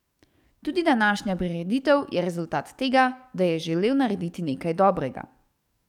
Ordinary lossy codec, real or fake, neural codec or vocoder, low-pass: none; fake; codec, 44.1 kHz, 7.8 kbps, DAC; 19.8 kHz